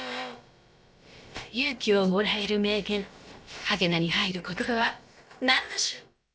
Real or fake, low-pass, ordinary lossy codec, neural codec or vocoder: fake; none; none; codec, 16 kHz, about 1 kbps, DyCAST, with the encoder's durations